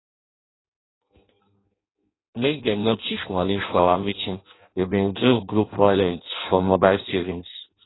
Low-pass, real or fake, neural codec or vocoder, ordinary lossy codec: 7.2 kHz; fake; codec, 16 kHz in and 24 kHz out, 0.6 kbps, FireRedTTS-2 codec; AAC, 16 kbps